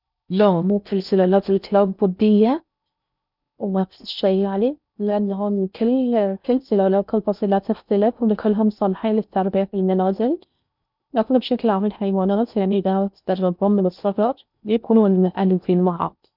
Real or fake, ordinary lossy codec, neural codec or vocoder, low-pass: fake; none; codec, 16 kHz in and 24 kHz out, 0.6 kbps, FocalCodec, streaming, 4096 codes; 5.4 kHz